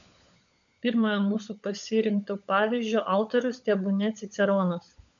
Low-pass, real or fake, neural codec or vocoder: 7.2 kHz; fake; codec, 16 kHz, 16 kbps, FunCodec, trained on LibriTTS, 50 frames a second